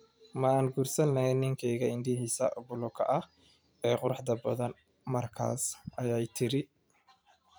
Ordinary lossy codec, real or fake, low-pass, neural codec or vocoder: none; fake; none; vocoder, 44.1 kHz, 128 mel bands every 512 samples, BigVGAN v2